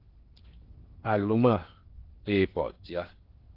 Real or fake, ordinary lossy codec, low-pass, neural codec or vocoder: fake; Opus, 16 kbps; 5.4 kHz; codec, 16 kHz in and 24 kHz out, 0.8 kbps, FocalCodec, streaming, 65536 codes